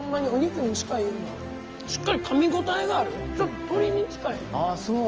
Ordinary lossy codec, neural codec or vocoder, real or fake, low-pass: Opus, 24 kbps; none; real; 7.2 kHz